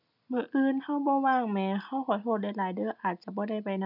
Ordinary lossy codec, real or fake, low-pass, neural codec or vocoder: none; real; 5.4 kHz; none